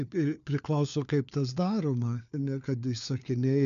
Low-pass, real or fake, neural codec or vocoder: 7.2 kHz; fake; codec, 16 kHz, 4 kbps, FunCodec, trained on LibriTTS, 50 frames a second